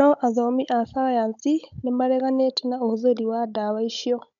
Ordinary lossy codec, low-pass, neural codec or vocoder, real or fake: none; 7.2 kHz; codec, 16 kHz, 16 kbps, FunCodec, trained on Chinese and English, 50 frames a second; fake